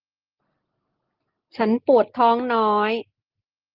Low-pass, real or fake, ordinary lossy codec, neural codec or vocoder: 5.4 kHz; real; Opus, 16 kbps; none